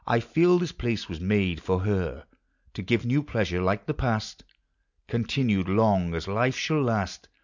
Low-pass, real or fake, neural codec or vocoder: 7.2 kHz; real; none